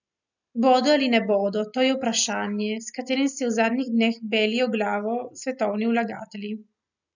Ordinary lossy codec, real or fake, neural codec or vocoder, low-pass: none; real; none; 7.2 kHz